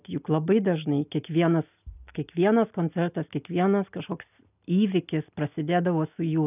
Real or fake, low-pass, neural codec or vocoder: real; 3.6 kHz; none